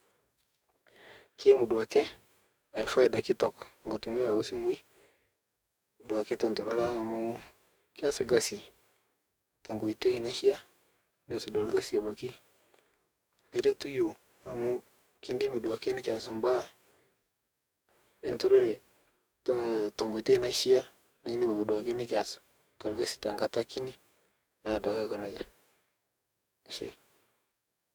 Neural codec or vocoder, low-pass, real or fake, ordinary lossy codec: codec, 44.1 kHz, 2.6 kbps, DAC; none; fake; none